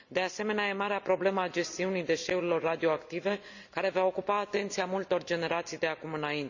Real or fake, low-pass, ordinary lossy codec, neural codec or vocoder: real; 7.2 kHz; none; none